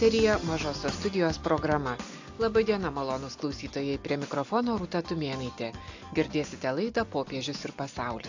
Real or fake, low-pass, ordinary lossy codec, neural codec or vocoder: real; 7.2 kHz; AAC, 48 kbps; none